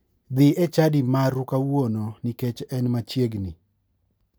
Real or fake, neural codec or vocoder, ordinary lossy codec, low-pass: real; none; none; none